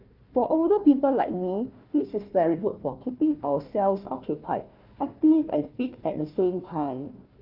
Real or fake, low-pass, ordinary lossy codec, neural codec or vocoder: fake; 5.4 kHz; Opus, 24 kbps; codec, 16 kHz, 1 kbps, FunCodec, trained on Chinese and English, 50 frames a second